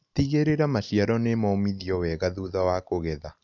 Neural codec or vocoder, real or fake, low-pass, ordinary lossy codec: none; real; 7.2 kHz; none